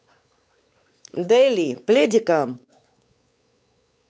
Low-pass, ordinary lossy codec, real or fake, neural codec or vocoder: none; none; fake; codec, 16 kHz, 4 kbps, X-Codec, WavLM features, trained on Multilingual LibriSpeech